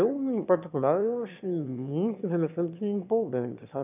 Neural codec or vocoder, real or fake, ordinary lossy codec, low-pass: autoencoder, 22.05 kHz, a latent of 192 numbers a frame, VITS, trained on one speaker; fake; none; 3.6 kHz